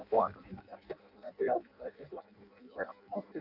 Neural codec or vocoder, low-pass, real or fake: codec, 16 kHz in and 24 kHz out, 0.6 kbps, FireRedTTS-2 codec; 5.4 kHz; fake